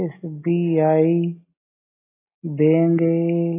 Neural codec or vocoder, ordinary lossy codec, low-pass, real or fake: none; AAC, 16 kbps; 3.6 kHz; real